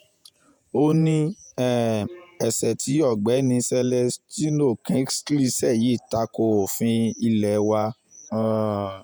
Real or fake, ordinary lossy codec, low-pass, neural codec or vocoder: fake; none; none; vocoder, 48 kHz, 128 mel bands, Vocos